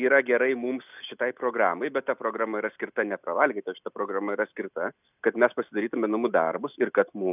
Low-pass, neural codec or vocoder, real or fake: 3.6 kHz; none; real